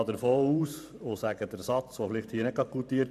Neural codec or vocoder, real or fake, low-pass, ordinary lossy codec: vocoder, 44.1 kHz, 128 mel bands every 512 samples, BigVGAN v2; fake; 14.4 kHz; none